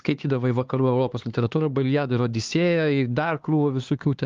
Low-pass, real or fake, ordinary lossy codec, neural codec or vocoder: 7.2 kHz; fake; Opus, 32 kbps; codec, 16 kHz, 2 kbps, X-Codec, HuBERT features, trained on LibriSpeech